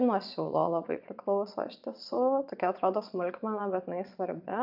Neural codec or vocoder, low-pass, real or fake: vocoder, 22.05 kHz, 80 mel bands, Vocos; 5.4 kHz; fake